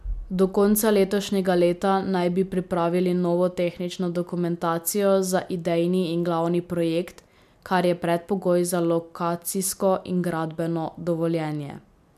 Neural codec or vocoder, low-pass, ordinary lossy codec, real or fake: none; 14.4 kHz; MP3, 96 kbps; real